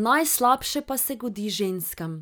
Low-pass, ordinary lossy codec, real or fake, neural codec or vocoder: none; none; real; none